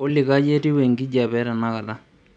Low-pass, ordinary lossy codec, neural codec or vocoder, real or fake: 9.9 kHz; none; none; real